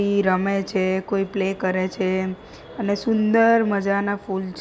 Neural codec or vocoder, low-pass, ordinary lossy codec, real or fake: none; none; none; real